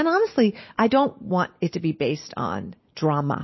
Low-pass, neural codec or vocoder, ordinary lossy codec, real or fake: 7.2 kHz; none; MP3, 24 kbps; real